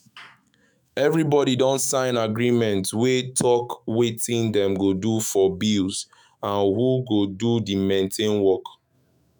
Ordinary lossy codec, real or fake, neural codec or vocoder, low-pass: none; fake; autoencoder, 48 kHz, 128 numbers a frame, DAC-VAE, trained on Japanese speech; none